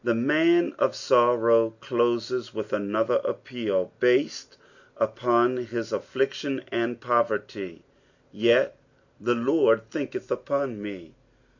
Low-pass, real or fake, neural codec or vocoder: 7.2 kHz; real; none